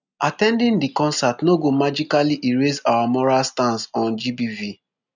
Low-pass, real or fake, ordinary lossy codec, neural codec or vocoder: 7.2 kHz; real; none; none